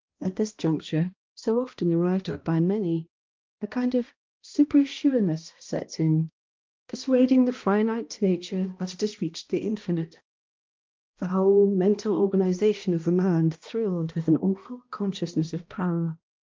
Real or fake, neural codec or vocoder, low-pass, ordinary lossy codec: fake; codec, 16 kHz, 1 kbps, X-Codec, HuBERT features, trained on balanced general audio; 7.2 kHz; Opus, 32 kbps